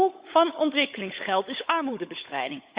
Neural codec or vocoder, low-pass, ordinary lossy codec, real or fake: codec, 16 kHz, 16 kbps, FunCodec, trained on LibriTTS, 50 frames a second; 3.6 kHz; Opus, 64 kbps; fake